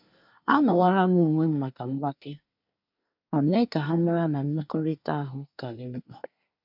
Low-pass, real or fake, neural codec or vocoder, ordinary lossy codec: 5.4 kHz; fake; codec, 24 kHz, 1 kbps, SNAC; none